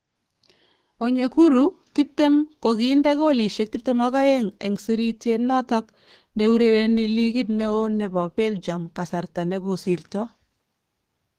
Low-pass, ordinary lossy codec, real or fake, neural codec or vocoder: 14.4 kHz; Opus, 24 kbps; fake; codec, 32 kHz, 1.9 kbps, SNAC